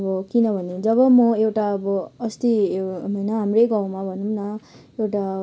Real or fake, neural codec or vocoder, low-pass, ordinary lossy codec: real; none; none; none